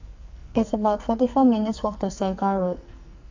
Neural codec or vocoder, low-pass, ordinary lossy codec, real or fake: codec, 44.1 kHz, 2.6 kbps, SNAC; 7.2 kHz; AAC, 48 kbps; fake